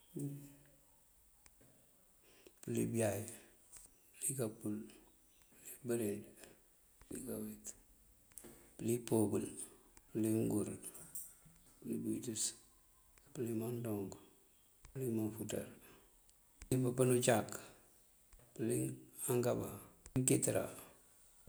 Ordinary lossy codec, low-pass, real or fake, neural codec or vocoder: none; none; real; none